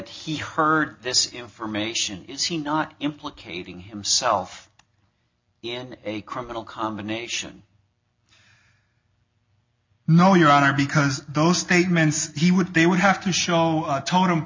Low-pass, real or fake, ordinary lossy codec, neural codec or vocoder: 7.2 kHz; real; MP3, 64 kbps; none